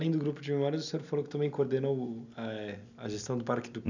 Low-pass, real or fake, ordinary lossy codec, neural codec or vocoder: 7.2 kHz; real; none; none